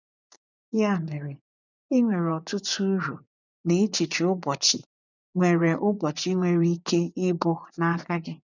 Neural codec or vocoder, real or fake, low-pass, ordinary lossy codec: vocoder, 24 kHz, 100 mel bands, Vocos; fake; 7.2 kHz; none